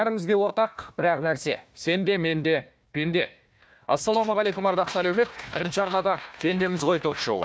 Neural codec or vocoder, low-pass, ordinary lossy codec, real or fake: codec, 16 kHz, 1 kbps, FunCodec, trained on Chinese and English, 50 frames a second; none; none; fake